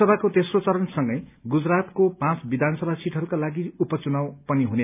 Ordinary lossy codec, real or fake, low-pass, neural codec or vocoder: none; real; 3.6 kHz; none